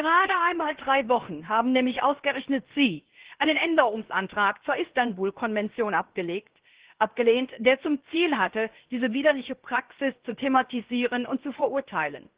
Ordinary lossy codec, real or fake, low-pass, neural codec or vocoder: Opus, 16 kbps; fake; 3.6 kHz; codec, 16 kHz, 0.7 kbps, FocalCodec